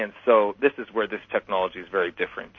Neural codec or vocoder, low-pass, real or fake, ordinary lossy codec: none; 7.2 kHz; real; MP3, 32 kbps